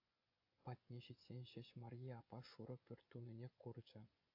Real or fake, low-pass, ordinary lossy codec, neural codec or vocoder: fake; 5.4 kHz; AAC, 48 kbps; vocoder, 24 kHz, 100 mel bands, Vocos